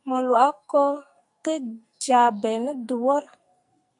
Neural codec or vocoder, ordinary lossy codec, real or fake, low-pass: codec, 44.1 kHz, 2.6 kbps, SNAC; MP3, 64 kbps; fake; 10.8 kHz